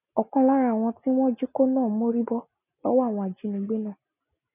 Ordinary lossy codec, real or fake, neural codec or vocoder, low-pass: none; real; none; 3.6 kHz